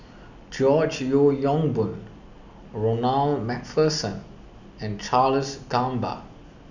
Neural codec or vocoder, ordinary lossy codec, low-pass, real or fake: none; none; 7.2 kHz; real